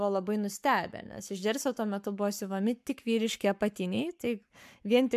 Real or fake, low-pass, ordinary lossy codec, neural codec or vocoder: fake; 14.4 kHz; MP3, 96 kbps; codec, 44.1 kHz, 7.8 kbps, Pupu-Codec